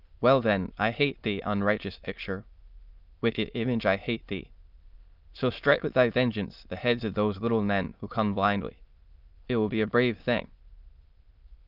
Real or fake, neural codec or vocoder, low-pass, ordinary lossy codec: fake; autoencoder, 22.05 kHz, a latent of 192 numbers a frame, VITS, trained on many speakers; 5.4 kHz; Opus, 24 kbps